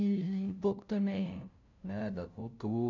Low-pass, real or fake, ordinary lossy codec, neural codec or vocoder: 7.2 kHz; fake; none; codec, 16 kHz, 0.5 kbps, FunCodec, trained on LibriTTS, 25 frames a second